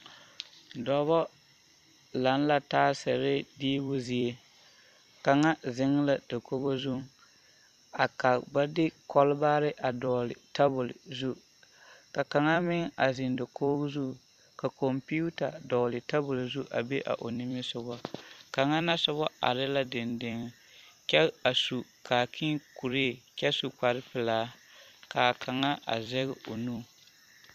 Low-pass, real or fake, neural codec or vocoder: 14.4 kHz; fake; vocoder, 44.1 kHz, 128 mel bands every 256 samples, BigVGAN v2